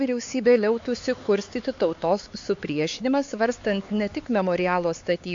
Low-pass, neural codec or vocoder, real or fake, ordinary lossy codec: 7.2 kHz; codec, 16 kHz, 4 kbps, X-Codec, HuBERT features, trained on LibriSpeech; fake; AAC, 48 kbps